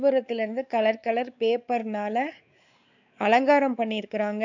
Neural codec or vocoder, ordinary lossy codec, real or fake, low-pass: codec, 16 kHz, 4 kbps, X-Codec, WavLM features, trained on Multilingual LibriSpeech; none; fake; 7.2 kHz